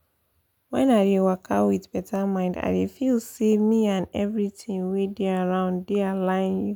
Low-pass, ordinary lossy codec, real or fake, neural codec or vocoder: 19.8 kHz; none; real; none